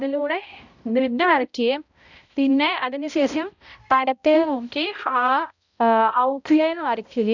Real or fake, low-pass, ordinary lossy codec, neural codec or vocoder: fake; 7.2 kHz; none; codec, 16 kHz, 0.5 kbps, X-Codec, HuBERT features, trained on balanced general audio